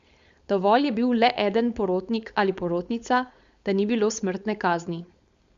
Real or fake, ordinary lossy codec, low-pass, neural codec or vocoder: fake; Opus, 64 kbps; 7.2 kHz; codec, 16 kHz, 4.8 kbps, FACodec